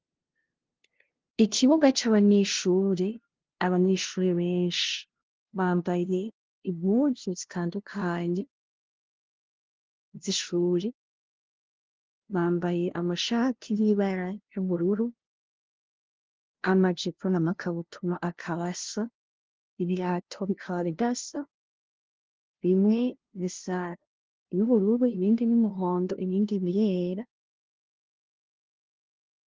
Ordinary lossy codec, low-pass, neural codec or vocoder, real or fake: Opus, 16 kbps; 7.2 kHz; codec, 16 kHz, 0.5 kbps, FunCodec, trained on LibriTTS, 25 frames a second; fake